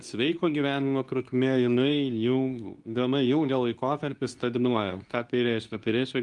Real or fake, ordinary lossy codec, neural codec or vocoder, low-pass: fake; Opus, 32 kbps; codec, 24 kHz, 0.9 kbps, WavTokenizer, medium speech release version 2; 10.8 kHz